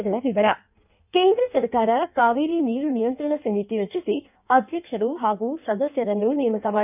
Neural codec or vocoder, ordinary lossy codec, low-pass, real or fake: codec, 16 kHz in and 24 kHz out, 1.1 kbps, FireRedTTS-2 codec; none; 3.6 kHz; fake